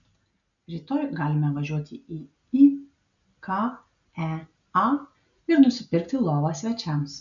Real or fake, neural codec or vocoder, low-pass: real; none; 7.2 kHz